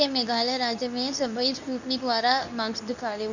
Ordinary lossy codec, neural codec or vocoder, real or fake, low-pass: none; codec, 24 kHz, 0.9 kbps, WavTokenizer, medium speech release version 2; fake; 7.2 kHz